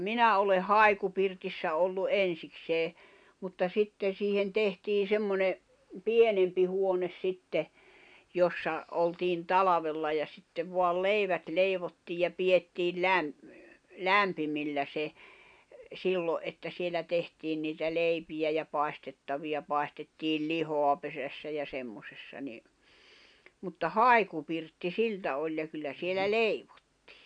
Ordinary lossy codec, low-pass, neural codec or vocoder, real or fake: none; 9.9 kHz; none; real